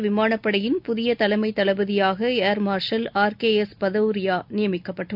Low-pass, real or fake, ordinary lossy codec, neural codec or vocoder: 5.4 kHz; real; none; none